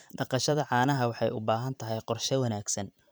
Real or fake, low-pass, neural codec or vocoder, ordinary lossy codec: fake; none; vocoder, 44.1 kHz, 128 mel bands every 512 samples, BigVGAN v2; none